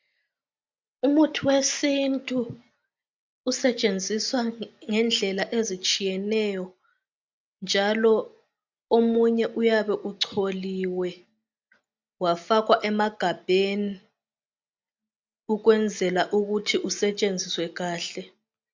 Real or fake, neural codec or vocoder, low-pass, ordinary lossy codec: real; none; 7.2 kHz; MP3, 64 kbps